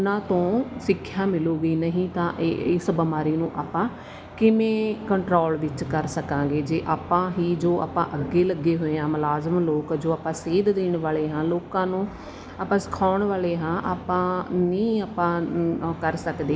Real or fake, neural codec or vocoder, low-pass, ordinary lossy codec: real; none; none; none